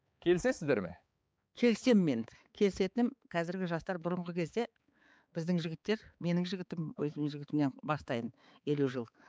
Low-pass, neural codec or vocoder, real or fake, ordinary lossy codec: none; codec, 16 kHz, 4 kbps, X-Codec, HuBERT features, trained on balanced general audio; fake; none